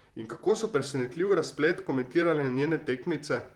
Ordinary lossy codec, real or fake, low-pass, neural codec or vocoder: Opus, 24 kbps; fake; 19.8 kHz; vocoder, 44.1 kHz, 128 mel bands, Pupu-Vocoder